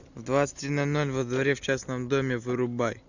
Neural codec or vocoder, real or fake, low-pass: none; real; 7.2 kHz